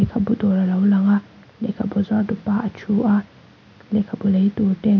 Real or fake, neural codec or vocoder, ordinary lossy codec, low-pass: real; none; none; 7.2 kHz